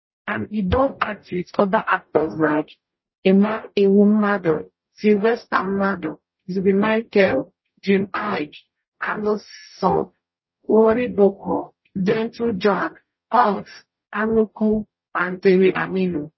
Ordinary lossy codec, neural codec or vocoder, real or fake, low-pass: MP3, 24 kbps; codec, 44.1 kHz, 0.9 kbps, DAC; fake; 7.2 kHz